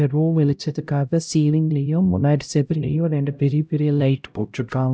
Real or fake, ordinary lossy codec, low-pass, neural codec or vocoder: fake; none; none; codec, 16 kHz, 0.5 kbps, X-Codec, HuBERT features, trained on LibriSpeech